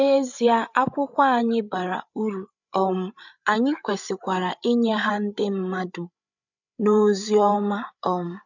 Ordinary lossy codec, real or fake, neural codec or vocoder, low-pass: none; fake; codec, 16 kHz, 8 kbps, FreqCodec, larger model; 7.2 kHz